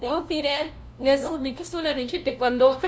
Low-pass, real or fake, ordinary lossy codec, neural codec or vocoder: none; fake; none; codec, 16 kHz, 0.5 kbps, FunCodec, trained on LibriTTS, 25 frames a second